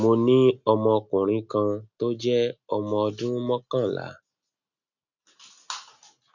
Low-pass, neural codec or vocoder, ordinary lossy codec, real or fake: 7.2 kHz; none; none; real